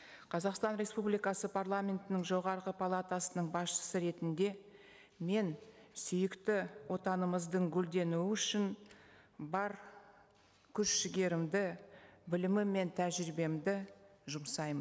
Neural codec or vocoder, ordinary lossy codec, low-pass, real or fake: none; none; none; real